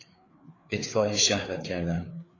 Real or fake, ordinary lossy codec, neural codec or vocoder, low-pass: fake; AAC, 32 kbps; codec, 16 kHz, 4 kbps, FreqCodec, larger model; 7.2 kHz